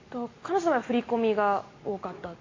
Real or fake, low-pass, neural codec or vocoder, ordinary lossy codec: real; 7.2 kHz; none; AAC, 32 kbps